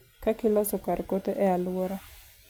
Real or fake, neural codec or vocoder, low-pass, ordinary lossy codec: real; none; none; none